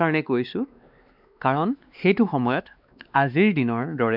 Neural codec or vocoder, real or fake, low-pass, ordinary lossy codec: codec, 16 kHz, 2 kbps, X-Codec, WavLM features, trained on Multilingual LibriSpeech; fake; 5.4 kHz; none